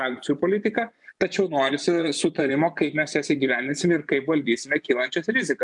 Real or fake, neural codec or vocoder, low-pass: fake; vocoder, 24 kHz, 100 mel bands, Vocos; 10.8 kHz